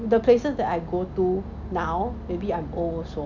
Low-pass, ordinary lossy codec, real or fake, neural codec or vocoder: 7.2 kHz; none; real; none